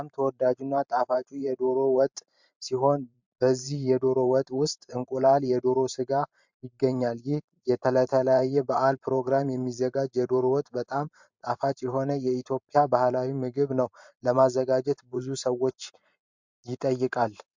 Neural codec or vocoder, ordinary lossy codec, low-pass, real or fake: vocoder, 44.1 kHz, 128 mel bands every 512 samples, BigVGAN v2; MP3, 64 kbps; 7.2 kHz; fake